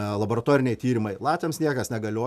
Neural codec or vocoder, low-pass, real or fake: none; 14.4 kHz; real